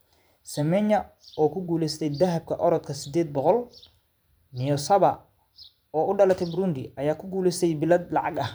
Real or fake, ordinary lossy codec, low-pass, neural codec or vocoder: fake; none; none; vocoder, 44.1 kHz, 128 mel bands every 512 samples, BigVGAN v2